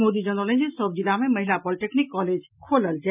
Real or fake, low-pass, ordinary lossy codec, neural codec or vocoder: real; 3.6 kHz; none; none